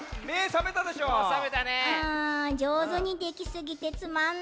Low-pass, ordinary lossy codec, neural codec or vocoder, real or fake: none; none; none; real